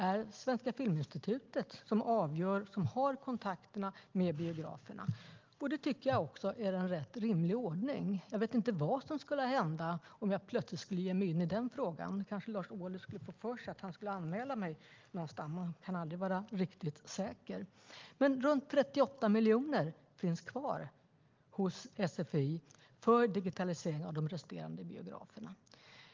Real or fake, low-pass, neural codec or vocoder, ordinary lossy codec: real; 7.2 kHz; none; Opus, 32 kbps